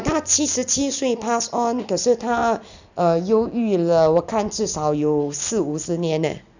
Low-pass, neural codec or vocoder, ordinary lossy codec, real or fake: 7.2 kHz; none; none; real